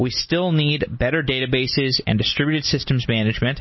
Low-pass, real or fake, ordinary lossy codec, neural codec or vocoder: 7.2 kHz; real; MP3, 24 kbps; none